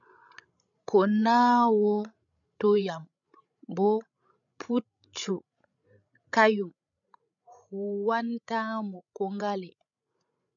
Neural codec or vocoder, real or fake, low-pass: codec, 16 kHz, 16 kbps, FreqCodec, larger model; fake; 7.2 kHz